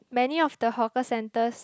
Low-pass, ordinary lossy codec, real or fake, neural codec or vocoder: none; none; real; none